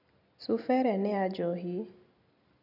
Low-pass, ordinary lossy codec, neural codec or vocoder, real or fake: 5.4 kHz; none; none; real